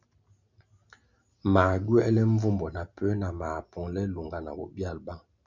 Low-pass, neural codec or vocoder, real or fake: 7.2 kHz; none; real